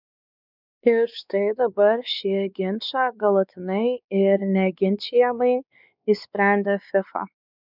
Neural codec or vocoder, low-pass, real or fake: codec, 16 kHz, 4 kbps, X-Codec, WavLM features, trained on Multilingual LibriSpeech; 5.4 kHz; fake